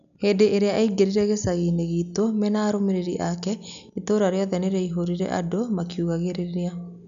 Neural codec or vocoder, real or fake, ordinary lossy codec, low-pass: none; real; none; 7.2 kHz